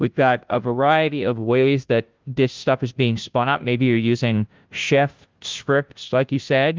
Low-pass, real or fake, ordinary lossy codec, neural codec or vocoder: 7.2 kHz; fake; Opus, 32 kbps; codec, 16 kHz, 0.5 kbps, FunCodec, trained on Chinese and English, 25 frames a second